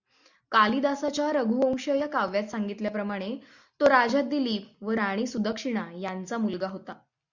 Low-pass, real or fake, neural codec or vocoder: 7.2 kHz; real; none